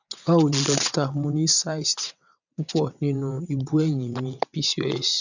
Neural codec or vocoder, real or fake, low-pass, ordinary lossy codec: vocoder, 22.05 kHz, 80 mel bands, WaveNeXt; fake; 7.2 kHz; none